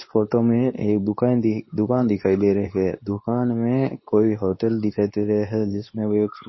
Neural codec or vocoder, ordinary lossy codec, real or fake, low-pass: codec, 24 kHz, 0.9 kbps, WavTokenizer, medium speech release version 2; MP3, 24 kbps; fake; 7.2 kHz